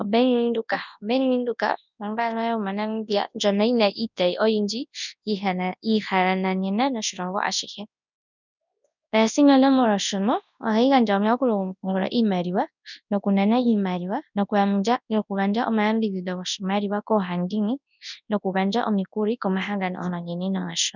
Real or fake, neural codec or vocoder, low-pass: fake; codec, 24 kHz, 0.9 kbps, WavTokenizer, large speech release; 7.2 kHz